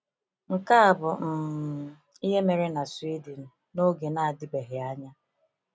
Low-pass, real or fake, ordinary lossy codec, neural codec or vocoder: none; real; none; none